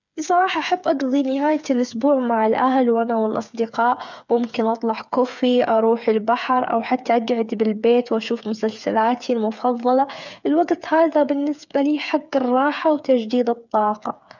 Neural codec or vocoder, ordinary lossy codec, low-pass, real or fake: codec, 16 kHz, 8 kbps, FreqCodec, smaller model; none; 7.2 kHz; fake